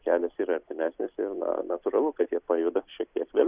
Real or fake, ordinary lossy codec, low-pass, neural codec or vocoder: real; Opus, 64 kbps; 3.6 kHz; none